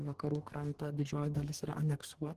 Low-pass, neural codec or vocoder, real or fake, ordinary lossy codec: 14.4 kHz; codec, 44.1 kHz, 2.6 kbps, DAC; fake; Opus, 16 kbps